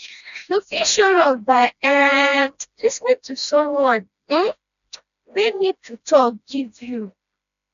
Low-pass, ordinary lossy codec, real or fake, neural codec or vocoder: 7.2 kHz; none; fake; codec, 16 kHz, 1 kbps, FreqCodec, smaller model